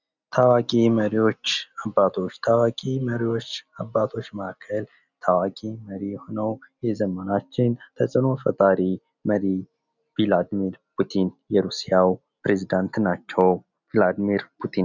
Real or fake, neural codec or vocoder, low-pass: real; none; 7.2 kHz